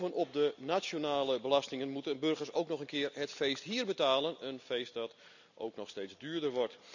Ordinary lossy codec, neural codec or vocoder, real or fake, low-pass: none; none; real; 7.2 kHz